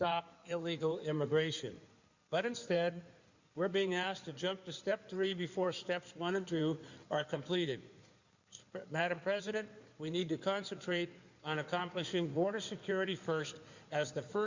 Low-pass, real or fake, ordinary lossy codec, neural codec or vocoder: 7.2 kHz; fake; Opus, 64 kbps; codec, 16 kHz in and 24 kHz out, 2.2 kbps, FireRedTTS-2 codec